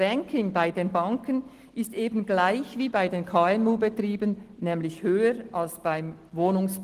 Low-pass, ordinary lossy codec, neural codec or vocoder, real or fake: 14.4 kHz; Opus, 16 kbps; none; real